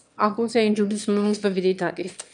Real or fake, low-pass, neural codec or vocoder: fake; 9.9 kHz; autoencoder, 22.05 kHz, a latent of 192 numbers a frame, VITS, trained on one speaker